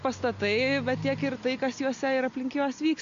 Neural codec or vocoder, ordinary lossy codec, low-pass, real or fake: none; AAC, 64 kbps; 7.2 kHz; real